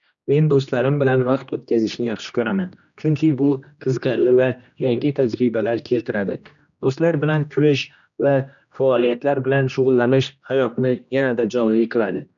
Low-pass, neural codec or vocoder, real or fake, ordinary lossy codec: 7.2 kHz; codec, 16 kHz, 1 kbps, X-Codec, HuBERT features, trained on general audio; fake; none